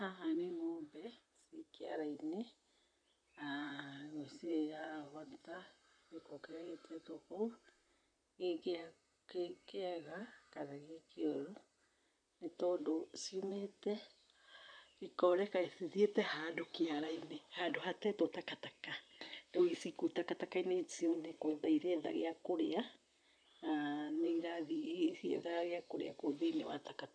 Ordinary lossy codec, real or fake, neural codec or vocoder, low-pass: none; fake; vocoder, 44.1 kHz, 128 mel bands, Pupu-Vocoder; 9.9 kHz